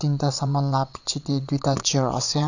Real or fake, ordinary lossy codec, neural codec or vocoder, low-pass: fake; none; vocoder, 22.05 kHz, 80 mel bands, WaveNeXt; 7.2 kHz